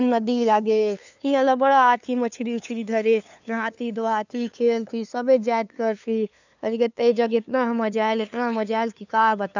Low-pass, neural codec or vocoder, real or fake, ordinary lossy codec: 7.2 kHz; codec, 16 kHz, 2 kbps, FunCodec, trained on LibriTTS, 25 frames a second; fake; none